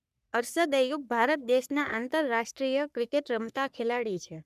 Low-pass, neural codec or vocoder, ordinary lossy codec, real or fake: 14.4 kHz; codec, 44.1 kHz, 3.4 kbps, Pupu-Codec; none; fake